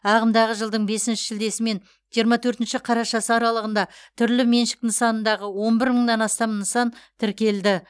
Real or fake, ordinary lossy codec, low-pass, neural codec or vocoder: real; none; none; none